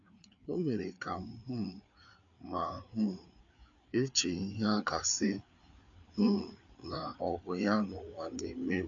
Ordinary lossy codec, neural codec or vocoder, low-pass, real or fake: AAC, 64 kbps; codec, 16 kHz, 4 kbps, FreqCodec, larger model; 7.2 kHz; fake